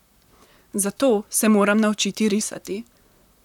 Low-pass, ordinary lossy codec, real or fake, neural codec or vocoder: 19.8 kHz; none; fake; vocoder, 44.1 kHz, 128 mel bands, Pupu-Vocoder